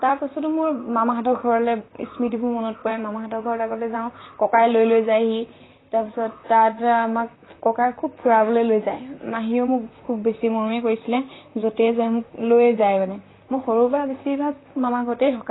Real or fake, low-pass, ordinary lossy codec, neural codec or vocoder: fake; 7.2 kHz; AAC, 16 kbps; vocoder, 44.1 kHz, 128 mel bands, Pupu-Vocoder